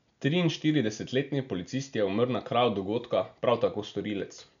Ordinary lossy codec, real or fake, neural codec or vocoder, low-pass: none; real; none; 7.2 kHz